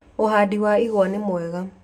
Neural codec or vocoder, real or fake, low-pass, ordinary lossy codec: none; real; 19.8 kHz; none